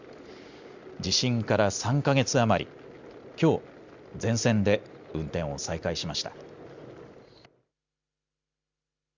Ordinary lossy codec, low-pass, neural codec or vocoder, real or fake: Opus, 64 kbps; 7.2 kHz; none; real